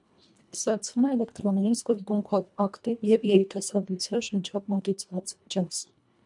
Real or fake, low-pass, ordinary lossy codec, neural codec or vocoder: fake; 10.8 kHz; MP3, 96 kbps; codec, 24 kHz, 1.5 kbps, HILCodec